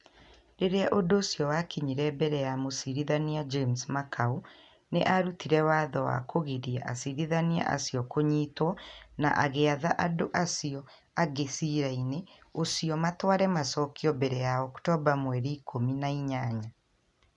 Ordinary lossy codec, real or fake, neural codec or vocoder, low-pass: none; real; none; 10.8 kHz